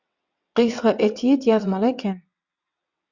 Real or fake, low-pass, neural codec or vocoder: fake; 7.2 kHz; vocoder, 22.05 kHz, 80 mel bands, WaveNeXt